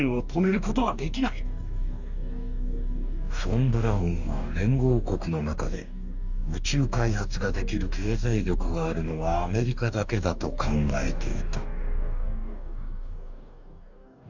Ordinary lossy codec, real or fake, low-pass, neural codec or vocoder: none; fake; 7.2 kHz; codec, 44.1 kHz, 2.6 kbps, DAC